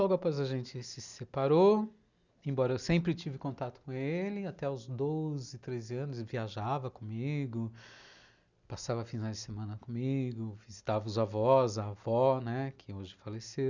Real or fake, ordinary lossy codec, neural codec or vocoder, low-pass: real; none; none; 7.2 kHz